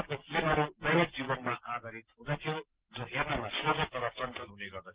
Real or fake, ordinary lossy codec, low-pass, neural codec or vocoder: real; Opus, 16 kbps; 3.6 kHz; none